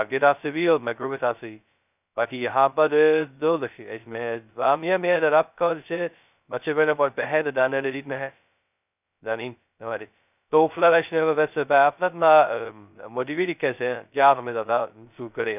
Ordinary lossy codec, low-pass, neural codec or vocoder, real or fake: none; 3.6 kHz; codec, 16 kHz, 0.2 kbps, FocalCodec; fake